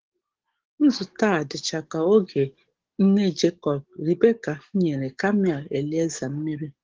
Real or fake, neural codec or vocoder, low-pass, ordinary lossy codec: fake; codec, 44.1 kHz, 7.8 kbps, DAC; 7.2 kHz; Opus, 16 kbps